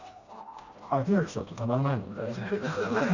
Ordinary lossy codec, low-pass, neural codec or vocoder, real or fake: Opus, 64 kbps; 7.2 kHz; codec, 16 kHz, 1 kbps, FreqCodec, smaller model; fake